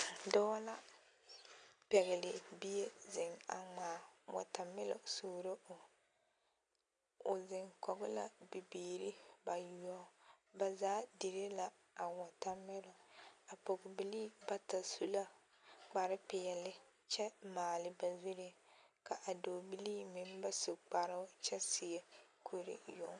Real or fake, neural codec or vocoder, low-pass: real; none; 9.9 kHz